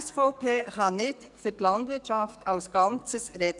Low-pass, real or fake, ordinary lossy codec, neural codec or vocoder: 10.8 kHz; fake; none; codec, 44.1 kHz, 2.6 kbps, SNAC